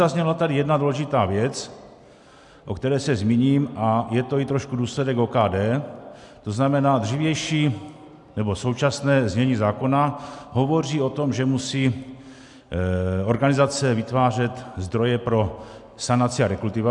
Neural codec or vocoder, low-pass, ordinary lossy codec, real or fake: none; 10.8 kHz; MP3, 96 kbps; real